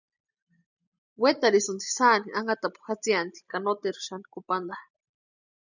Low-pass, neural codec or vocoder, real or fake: 7.2 kHz; none; real